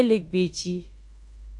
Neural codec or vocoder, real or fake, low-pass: codec, 16 kHz in and 24 kHz out, 0.9 kbps, LongCat-Audio-Codec, fine tuned four codebook decoder; fake; 10.8 kHz